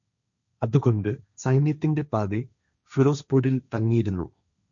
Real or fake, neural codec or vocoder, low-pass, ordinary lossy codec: fake; codec, 16 kHz, 1.1 kbps, Voila-Tokenizer; 7.2 kHz; none